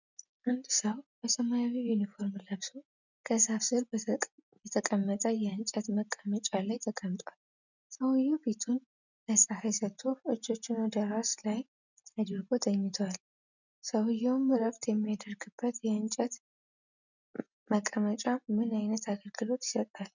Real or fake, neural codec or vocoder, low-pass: real; none; 7.2 kHz